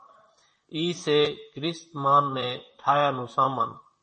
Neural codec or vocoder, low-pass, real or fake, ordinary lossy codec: vocoder, 44.1 kHz, 128 mel bands, Pupu-Vocoder; 10.8 kHz; fake; MP3, 32 kbps